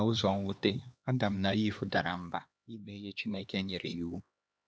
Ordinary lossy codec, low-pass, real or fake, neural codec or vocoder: none; none; fake; codec, 16 kHz, 2 kbps, X-Codec, HuBERT features, trained on LibriSpeech